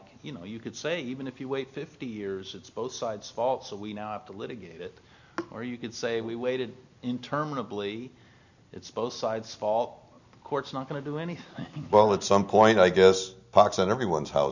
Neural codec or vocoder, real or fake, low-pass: none; real; 7.2 kHz